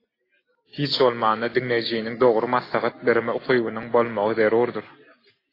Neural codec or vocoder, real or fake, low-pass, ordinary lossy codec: none; real; 5.4 kHz; AAC, 24 kbps